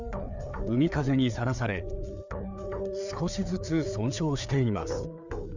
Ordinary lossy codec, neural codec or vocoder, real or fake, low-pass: none; codec, 16 kHz, 4 kbps, FreqCodec, larger model; fake; 7.2 kHz